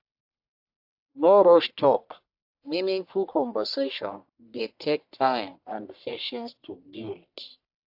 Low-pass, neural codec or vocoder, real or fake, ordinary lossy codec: 5.4 kHz; codec, 44.1 kHz, 1.7 kbps, Pupu-Codec; fake; AAC, 48 kbps